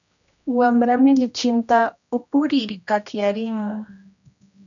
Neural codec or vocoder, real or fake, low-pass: codec, 16 kHz, 1 kbps, X-Codec, HuBERT features, trained on general audio; fake; 7.2 kHz